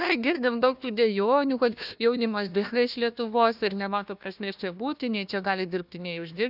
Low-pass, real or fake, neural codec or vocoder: 5.4 kHz; fake; codec, 16 kHz, 1 kbps, FunCodec, trained on Chinese and English, 50 frames a second